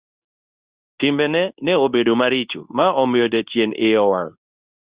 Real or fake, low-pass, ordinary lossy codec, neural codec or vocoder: fake; 3.6 kHz; Opus, 64 kbps; codec, 24 kHz, 0.9 kbps, WavTokenizer, medium speech release version 2